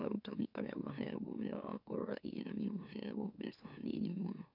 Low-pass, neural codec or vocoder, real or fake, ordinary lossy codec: 5.4 kHz; autoencoder, 44.1 kHz, a latent of 192 numbers a frame, MeloTTS; fake; none